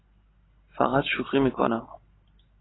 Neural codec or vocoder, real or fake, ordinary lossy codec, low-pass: none; real; AAC, 16 kbps; 7.2 kHz